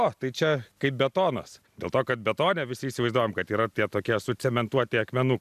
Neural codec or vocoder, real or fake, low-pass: none; real; 14.4 kHz